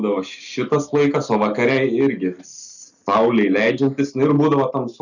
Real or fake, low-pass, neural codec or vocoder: real; 7.2 kHz; none